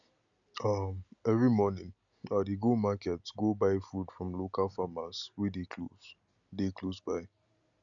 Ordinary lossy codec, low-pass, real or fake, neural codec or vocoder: none; 7.2 kHz; real; none